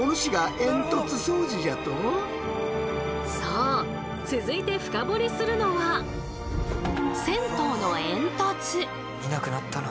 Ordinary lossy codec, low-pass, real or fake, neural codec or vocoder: none; none; real; none